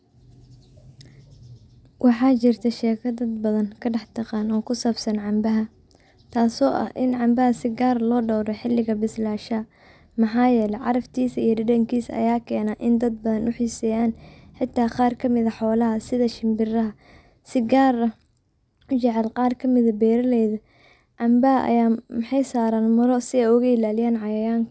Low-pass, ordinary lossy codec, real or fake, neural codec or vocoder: none; none; real; none